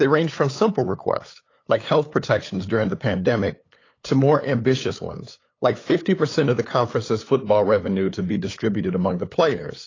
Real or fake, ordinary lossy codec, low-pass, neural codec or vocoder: fake; AAC, 32 kbps; 7.2 kHz; codec, 16 kHz, 8 kbps, FunCodec, trained on LibriTTS, 25 frames a second